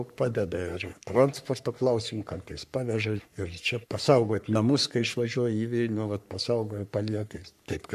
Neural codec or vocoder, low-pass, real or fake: codec, 44.1 kHz, 3.4 kbps, Pupu-Codec; 14.4 kHz; fake